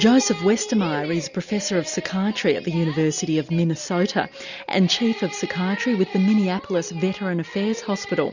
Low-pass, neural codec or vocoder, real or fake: 7.2 kHz; none; real